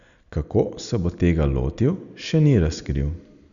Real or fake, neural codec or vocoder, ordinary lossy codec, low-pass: real; none; none; 7.2 kHz